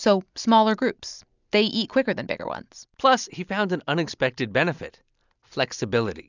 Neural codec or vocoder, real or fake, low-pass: none; real; 7.2 kHz